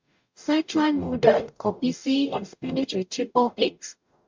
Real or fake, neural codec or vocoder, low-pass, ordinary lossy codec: fake; codec, 44.1 kHz, 0.9 kbps, DAC; 7.2 kHz; MP3, 64 kbps